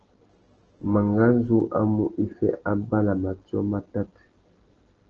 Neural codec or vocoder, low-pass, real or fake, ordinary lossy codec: none; 7.2 kHz; real; Opus, 16 kbps